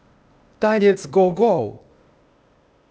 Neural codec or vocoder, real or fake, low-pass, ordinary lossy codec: codec, 16 kHz, 0.8 kbps, ZipCodec; fake; none; none